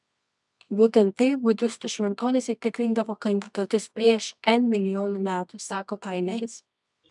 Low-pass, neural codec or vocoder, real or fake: 10.8 kHz; codec, 24 kHz, 0.9 kbps, WavTokenizer, medium music audio release; fake